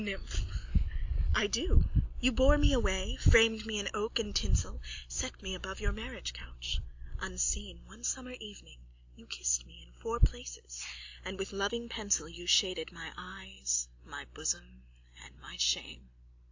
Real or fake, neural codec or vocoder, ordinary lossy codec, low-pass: real; none; AAC, 48 kbps; 7.2 kHz